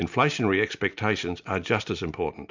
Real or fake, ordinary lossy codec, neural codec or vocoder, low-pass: real; MP3, 64 kbps; none; 7.2 kHz